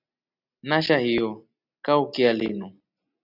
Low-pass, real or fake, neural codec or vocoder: 5.4 kHz; real; none